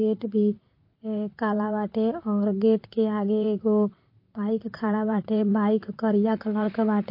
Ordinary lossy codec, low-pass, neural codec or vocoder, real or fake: MP3, 32 kbps; 5.4 kHz; vocoder, 44.1 kHz, 128 mel bands, Pupu-Vocoder; fake